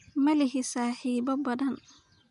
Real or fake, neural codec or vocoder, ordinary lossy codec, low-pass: real; none; none; 10.8 kHz